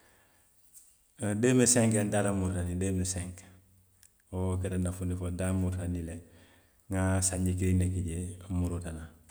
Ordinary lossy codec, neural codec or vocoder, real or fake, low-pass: none; none; real; none